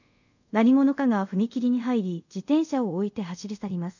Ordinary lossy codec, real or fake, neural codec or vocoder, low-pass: none; fake; codec, 24 kHz, 0.5 kbps, DualCodec; 7.2 kHz